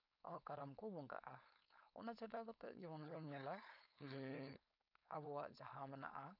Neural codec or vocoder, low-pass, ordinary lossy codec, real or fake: codec, 16 kHz, 4.8 kbps, FACodec; 5.4 kHz; none; fake